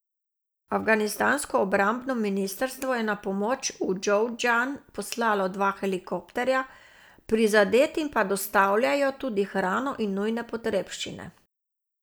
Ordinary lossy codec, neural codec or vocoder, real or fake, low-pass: none; none; real; none